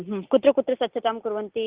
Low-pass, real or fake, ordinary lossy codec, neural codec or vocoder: 3.6 kHz; real; Opus, 32 kbps; none